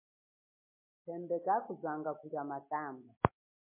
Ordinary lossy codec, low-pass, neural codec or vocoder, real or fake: MP3, 24 kbps; 3.6 kHz; none; real